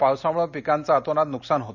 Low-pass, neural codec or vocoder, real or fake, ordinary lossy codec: 7.2 kHz; none; real; none